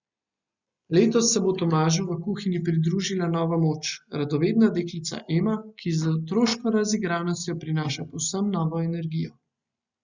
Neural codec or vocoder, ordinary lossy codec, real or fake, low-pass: none; Opus, 64 kbps; real; 7.2 kHz